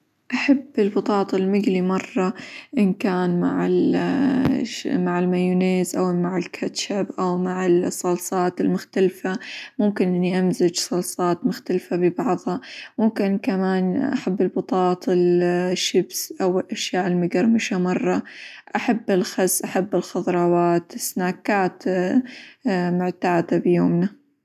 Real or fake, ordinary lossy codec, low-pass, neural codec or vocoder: real; none; 14.4 kHz; none